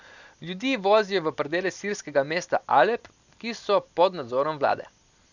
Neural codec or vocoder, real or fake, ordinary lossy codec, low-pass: none; real; none; 7.2 kHz